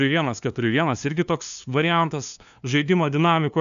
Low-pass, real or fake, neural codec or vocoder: 7.2 kHz; fake; codec, 16 kHz, 2 kbps, FunCodec, trained on LibriTTS, 25 frames a second